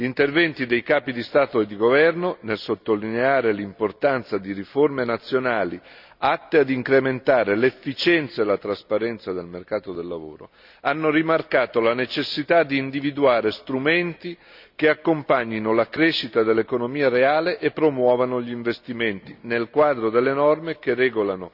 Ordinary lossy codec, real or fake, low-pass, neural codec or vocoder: none; real; 5.4 kHz; none